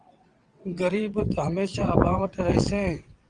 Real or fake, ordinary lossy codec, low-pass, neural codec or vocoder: fake; Opus, 24 kbps; 9.9 kHz; vocoder, 22.05 kHz, 80 mel bands, WaveNeXt